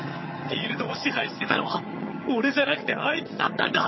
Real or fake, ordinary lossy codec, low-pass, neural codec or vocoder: fake; MP3, 24 kbps; 7.2 kHz; vocoder, 22.05 kHz, 80 mel bands, HiFi-GAN